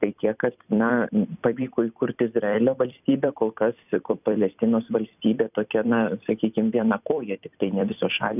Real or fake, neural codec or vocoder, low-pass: fake; vocoder, 44.1 kHz, 128 mel bands every 256 samples, BigVGAN v2; 3.6 kHz